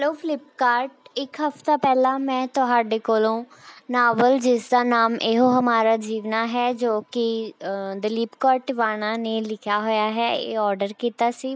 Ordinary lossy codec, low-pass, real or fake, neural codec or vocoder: none; none; real; none